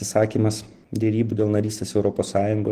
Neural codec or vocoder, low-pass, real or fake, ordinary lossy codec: none; 14.4 kHz; real; Opus, 16 kbps